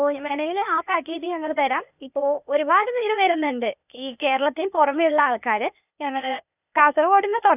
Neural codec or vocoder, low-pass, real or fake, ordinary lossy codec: codec, 16 kHz, 0.8 kbps, ZipCodec; 3.6 kHz; fake; none